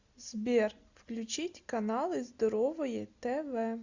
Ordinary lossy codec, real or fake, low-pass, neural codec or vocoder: Opus, 64 kbps; real; 7.2 kHz; none